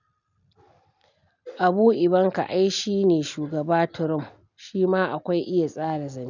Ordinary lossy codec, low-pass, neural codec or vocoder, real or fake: none; 7.2 kHz; none; real